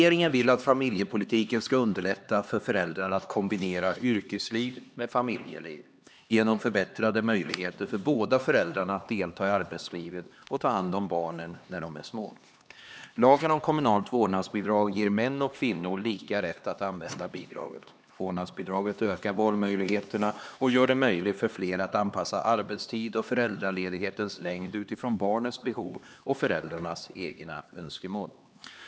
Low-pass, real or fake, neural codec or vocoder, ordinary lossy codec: none; fake; codec, 16 kHz, 2 kbps, X-Codec, HuBERT features, trained on LibriSpeech; none